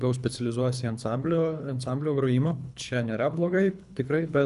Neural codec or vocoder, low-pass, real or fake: codec, 24 kHz, 3 kbps, HILCodec; 10.8 kHz; fake